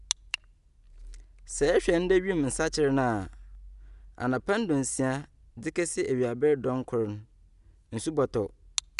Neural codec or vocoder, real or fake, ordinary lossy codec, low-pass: none; real; none; 10.8 kHz